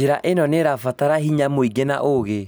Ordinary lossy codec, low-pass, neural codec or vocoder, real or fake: none; none; none; real